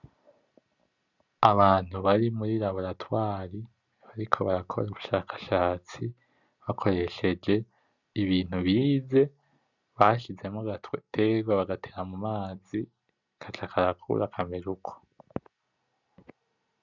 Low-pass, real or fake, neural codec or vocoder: 7.2 kHz; real; none